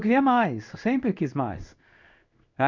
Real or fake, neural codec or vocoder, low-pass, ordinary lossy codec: fake; codec, 16 kHz in and 24 kHz out, 1 kbps, XY-Tokenizer; 7.2 kHz; none